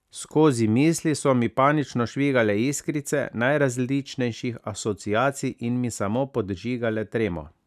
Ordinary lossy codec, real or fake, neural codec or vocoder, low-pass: none; real; none; 14.4 kHz